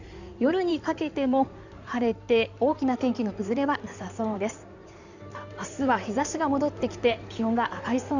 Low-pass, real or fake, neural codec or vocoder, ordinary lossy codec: 7.2 kHz; fake; codec, 16 kHz in and 24 kHz out, 2.2 kbps, FireRedTTS-2 codec; none